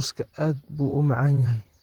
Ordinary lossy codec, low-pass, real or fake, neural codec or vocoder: Opus, 16 kbps; 19.8 kHz; fake; vocoder, 44.1 kHz, 128 mel bands, Pupu-Vocoder